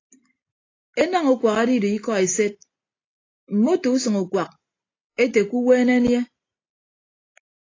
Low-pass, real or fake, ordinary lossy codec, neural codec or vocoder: 7.2 kHz; real; AAC, 32 kbps; none